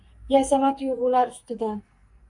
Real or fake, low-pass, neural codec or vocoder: fake; 10.8 kHz; codec, 44.1 kHz, 2.6 kbps, SNAC